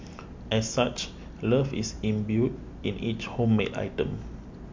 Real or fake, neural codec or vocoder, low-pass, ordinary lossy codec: real; none; 7.2 kHz; MP3, 48 kbps